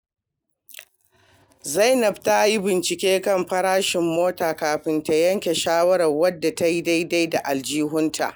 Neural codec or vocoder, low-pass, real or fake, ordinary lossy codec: none; none; real; none